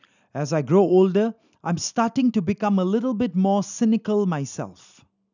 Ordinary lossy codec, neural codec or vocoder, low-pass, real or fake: none; none; 7.2 kHz; real